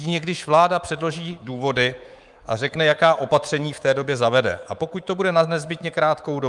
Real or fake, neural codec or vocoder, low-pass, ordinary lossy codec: fake; codec, 24 kHz, 3.1 kbps, DualCodec; 10.8 kHz; Opus, 32 kbps